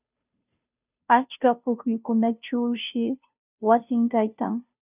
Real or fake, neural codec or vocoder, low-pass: fake; codec, 16 kHz, 0.5 kbps, FunCodec, trained on Chinese and English, 25 frames a second; 3.6 kHz